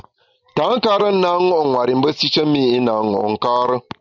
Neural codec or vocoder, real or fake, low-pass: none; real; 7.2 kHz